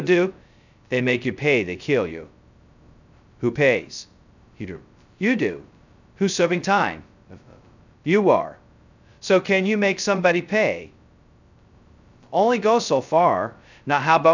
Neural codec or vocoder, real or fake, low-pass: codec, 16 kHz, 0.2 kbps, FocalCodec; fake; 7.2 kHz